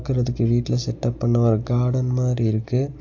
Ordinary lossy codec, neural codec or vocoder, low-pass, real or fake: AAC, 48 kbps; none; 7.2 kHz; real